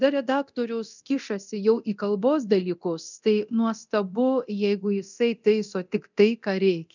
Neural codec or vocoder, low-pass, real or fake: codec, 24 kHz, 0.9 kbps, DualCodec; 7.2 kHz; fake